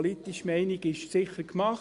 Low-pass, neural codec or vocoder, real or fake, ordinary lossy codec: 10.8 kHz; none; real; Opus, 64 kbps